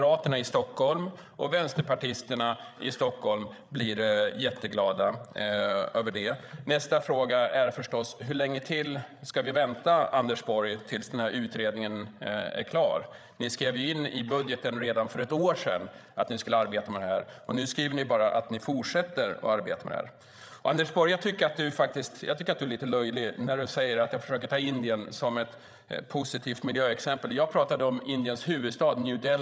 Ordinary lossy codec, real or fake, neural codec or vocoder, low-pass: none; fake; codec, 16 kHz, 8 kbps, FreqCodec, larger model; none